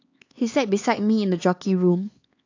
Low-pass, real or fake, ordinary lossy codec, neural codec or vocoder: 7.2 kHz; fake; AAC, 48 kbps; codec, 16 kHz, 4 kbps, X-Codec, HuBERT features, trained on LibriSpeech